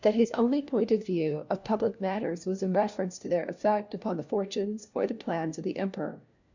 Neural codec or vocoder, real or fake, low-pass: codec, 16 kHz, 1 kbps, FunCodec, trained on LibriTTS, 50 frames a second; fake; 7.2 kHz